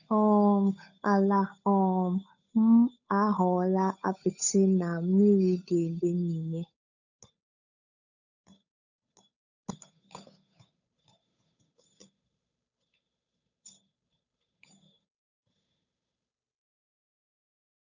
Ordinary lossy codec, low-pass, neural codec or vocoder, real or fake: none; 7.2 kHz; codec, 16 kHz, 8 kbps, FunCodec, trained on Chinese and English, 25 frames a second; fake